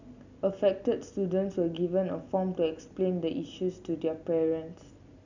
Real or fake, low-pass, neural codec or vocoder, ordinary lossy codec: real; 7.2 kHz; none; none